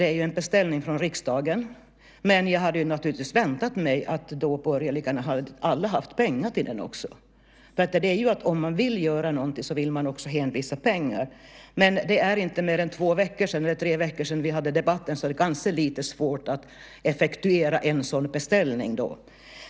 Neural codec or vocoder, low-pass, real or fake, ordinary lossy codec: none; none; real; none